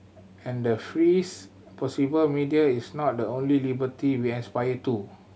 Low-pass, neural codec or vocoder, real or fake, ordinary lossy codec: none; none; real; none